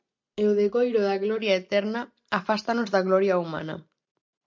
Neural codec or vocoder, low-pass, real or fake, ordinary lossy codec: none; 7.2 kHz; real; MP3, 64 kbps